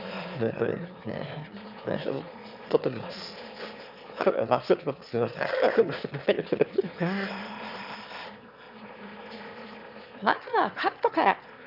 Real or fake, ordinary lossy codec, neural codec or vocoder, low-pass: fake; none; autoencoder, 22.05 kHz, a latent of 192 numbers a frame, VITS, trained on one speaker; 5.4 kHz